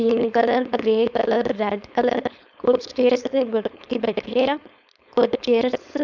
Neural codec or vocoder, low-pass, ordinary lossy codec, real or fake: codec, 16 kHz, 4.8 kbps, FACodec; 7.2 kHz; none; fake